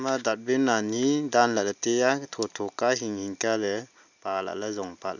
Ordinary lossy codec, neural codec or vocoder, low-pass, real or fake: none; none; 7.2 kHz; real